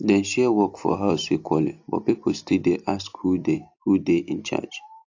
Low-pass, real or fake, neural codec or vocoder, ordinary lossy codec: 7.2 kHz; real; none; AAC, 48 kbps